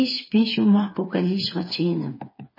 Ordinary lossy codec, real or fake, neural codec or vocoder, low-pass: MP3, 24 kbps; fake; codec, 16 kHz, 4 kbps, FreqCodec, smaller model; 5.4 kHz